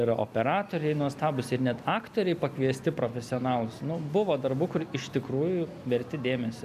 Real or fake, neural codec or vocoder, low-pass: real; none; 14.4 kHz